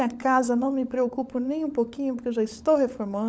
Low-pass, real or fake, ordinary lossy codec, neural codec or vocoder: none; fake; none; codec, 16 kHz, 16 kbps, FunCodec, trained on LibriTTS, 50 frames a second